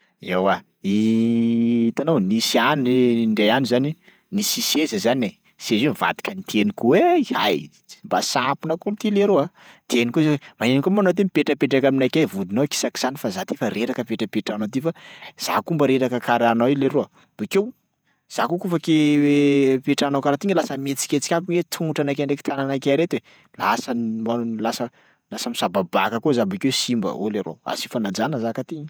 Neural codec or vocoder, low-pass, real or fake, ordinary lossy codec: vocoder, 48 kHz, 128 mel bands, Vocos; none; fake; none